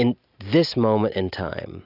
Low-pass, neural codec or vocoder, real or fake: 5.4 kHz; none; real